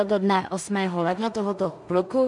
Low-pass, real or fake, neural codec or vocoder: 10.8 kHz; fake; codec, 16 kHz in and 24 kHz out, 0.4 kbps, LongCat-Audio-Codec, two codebook decoder